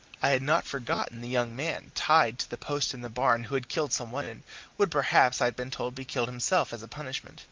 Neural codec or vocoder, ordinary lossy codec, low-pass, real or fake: none; Opus, 32 kbps; 7.2 kHz; real